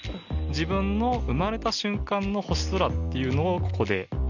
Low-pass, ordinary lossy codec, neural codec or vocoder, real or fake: 7.2 kHz; none; none; real